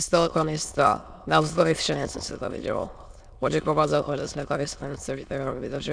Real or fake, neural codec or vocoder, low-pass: fake; autoencoder, 22.05 kHz, a latent of 192 numbers a frame, VITS, trained on many speakers; 9.9 kHz